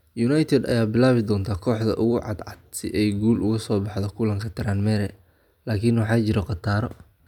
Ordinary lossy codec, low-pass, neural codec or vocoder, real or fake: none; 19.8 kHz; none; real